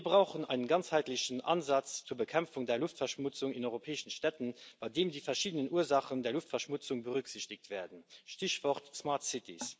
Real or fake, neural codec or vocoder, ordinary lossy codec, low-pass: real; none; none; none